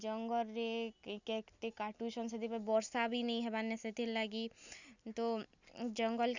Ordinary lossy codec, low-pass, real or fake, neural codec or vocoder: Opus, 64 kbps; 7.2 kHz; real; none